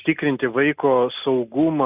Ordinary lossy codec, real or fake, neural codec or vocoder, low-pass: Opus, 64 kbps; real; none; 3.6 kHz